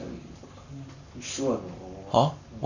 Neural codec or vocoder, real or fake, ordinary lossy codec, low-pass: codec, 44.1 kHz, 7.8 kbps, Pupu-Codec; fake; none; 7.2 kHz